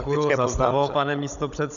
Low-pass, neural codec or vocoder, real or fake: 7.2 kHz; codec, 16 kHz, 16 kbps, FunCodec, trained on Chinese and English, 50 frames a second; fake